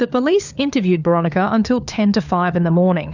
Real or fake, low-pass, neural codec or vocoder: fake; 7.2 kHz; codec, 16 kHz, 4 kbps, FunCodec, trained on LibriTTS, 50 frames a second